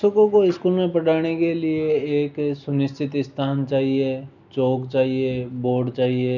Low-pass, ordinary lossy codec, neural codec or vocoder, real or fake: 7.2 kHz; none; none; real